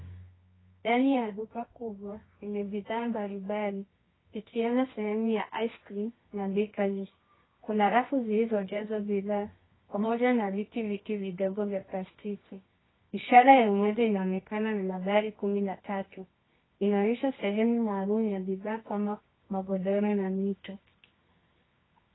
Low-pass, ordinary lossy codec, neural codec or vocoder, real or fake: 7.2 kHz; AAC, 16 kbps; codec, 24 kHz, 0.9 kbps, WavTokenizer, medium music audio release; fake